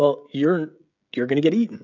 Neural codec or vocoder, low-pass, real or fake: codec, 16 kHz, 16 kbps, FreqCodec, smaller model; 7.2 kHz; fake